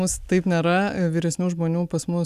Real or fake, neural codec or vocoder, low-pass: real; none; 14.4 kHz